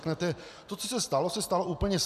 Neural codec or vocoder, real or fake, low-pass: none; real; 14.4 kHz